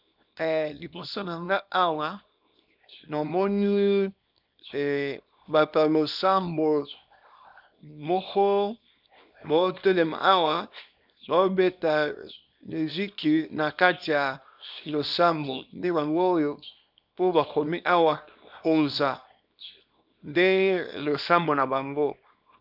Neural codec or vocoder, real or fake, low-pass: codec, 24 kHz, 0.9 kbps, WavTokenizer, small release; fake; 5.4 kHz